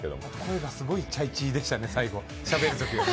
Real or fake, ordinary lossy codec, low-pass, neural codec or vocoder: real; none; none; none